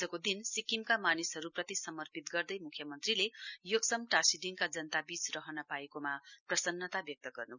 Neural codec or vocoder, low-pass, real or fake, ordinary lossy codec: none; 7.2 kHz; real; none